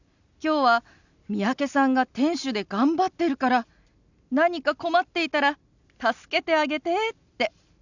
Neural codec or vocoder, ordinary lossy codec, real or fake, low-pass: none; none; real; 7.2 kHz